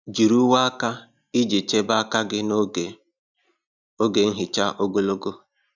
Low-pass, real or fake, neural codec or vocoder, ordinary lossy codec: 7.2 kHz; real; none; none